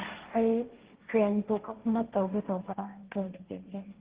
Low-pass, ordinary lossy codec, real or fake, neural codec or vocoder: 3.6 kHz; Opus, 16 kbps; fake; codec, 16 kHz, 1.1 kbps, Voila-Tokenizer